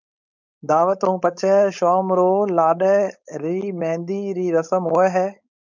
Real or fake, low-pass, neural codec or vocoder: fake; 7.2 kHz; codec, 16 kHz, 4.8 kbps, FACodec